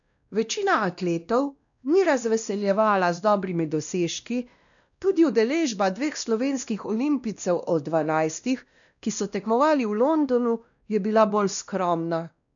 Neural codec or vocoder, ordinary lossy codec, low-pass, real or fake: codec, 16 kHz, 1 kbps, X-Codec, WavLM features, trained on Multilingual LibriSpeech; none; 7.2 kHz; fake